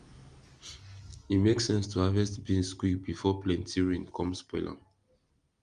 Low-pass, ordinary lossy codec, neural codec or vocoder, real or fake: 9.9 kHz; Opus, 32 kbps; none; real